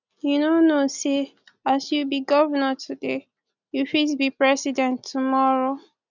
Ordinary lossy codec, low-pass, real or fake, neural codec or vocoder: none; 7.2 kHz; real; none